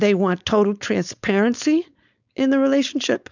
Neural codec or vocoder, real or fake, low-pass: codec, 16 kHz, 4.8 kbps, FACodec; fake; 7.2 kHz